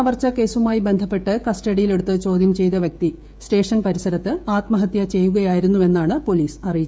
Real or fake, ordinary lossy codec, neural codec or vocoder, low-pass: fake; none; codec, 16 kHz, 16 kbps, FreqCodec, smaller model; none